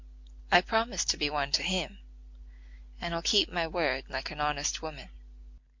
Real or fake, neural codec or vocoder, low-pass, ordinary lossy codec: real; none; 7.2 kHz; MP3, 48 kbps